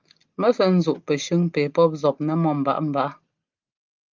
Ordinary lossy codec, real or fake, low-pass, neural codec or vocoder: Opus, 24 kbps; real; 7.2 kHz; none